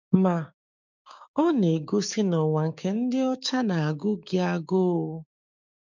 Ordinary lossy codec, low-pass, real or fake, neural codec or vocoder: none; 7.2 kHz; fake; codec, 16 kHz, 6 kbps, DAC